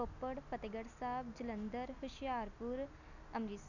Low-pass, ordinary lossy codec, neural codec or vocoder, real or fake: 7.2 kHz; none; none; real